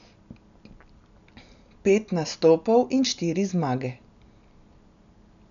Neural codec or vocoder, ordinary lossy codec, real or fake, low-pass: none; none; real; 7.2 kHz